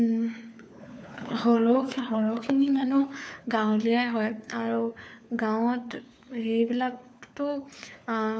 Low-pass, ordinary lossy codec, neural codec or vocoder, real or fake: none; none; codec, 16 kHz, 4 kbps, FunCodec, trained on LibriTTS, 50 frames a second; fake